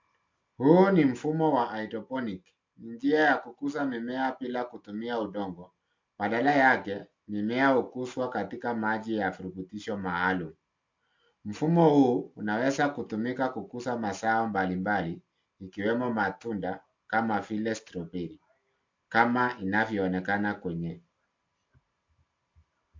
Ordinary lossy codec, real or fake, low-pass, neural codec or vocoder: MP3, 48 kbps; real; 7.2 kHz; none